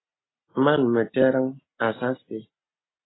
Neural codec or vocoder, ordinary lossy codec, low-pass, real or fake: none; AAC, 16 kbps; 7.2 kHz; real